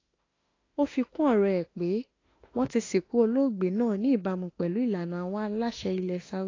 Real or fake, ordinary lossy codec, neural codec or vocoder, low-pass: fake; AAC, 32 kbps; autoencoder, 48 kHz, 32 numbers a frame, DAC-VAE, trained on Japanese speech; 7.2 kHz